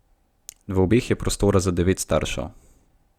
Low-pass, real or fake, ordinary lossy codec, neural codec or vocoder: 19.8 kHz; fake; Opus, 64 kbps; vocoder, 44.1 kHz, 128 mel bands every 256 samples, BigVGAN v2